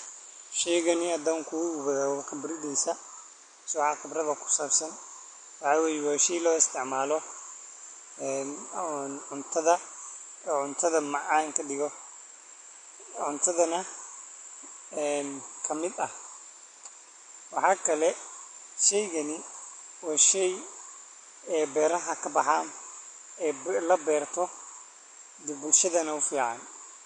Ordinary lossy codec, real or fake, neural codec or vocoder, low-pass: MP3, 48 kbps; real; none; 9.9 kHz